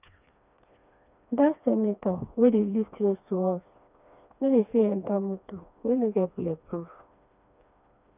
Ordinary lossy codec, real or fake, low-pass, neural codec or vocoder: none; fake; 3.6 kHz; codec, 16 kHz, 2 kbps, FreqCodec, smaller model